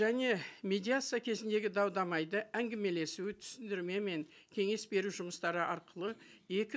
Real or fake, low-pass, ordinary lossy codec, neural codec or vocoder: real; none; none; none